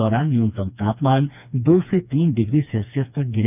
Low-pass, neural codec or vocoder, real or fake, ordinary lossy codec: 3.6 kHz; codec, 16 kHz, 2 kbps, FreqCodec, smaller model; fake; none